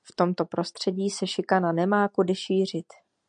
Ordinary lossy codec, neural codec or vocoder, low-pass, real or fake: MP3, 96 kbps; none; 9.9 kHz; real